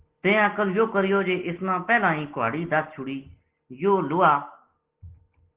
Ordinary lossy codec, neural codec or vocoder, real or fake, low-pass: Opus, 16 kbps; none; real; 3.6 kHz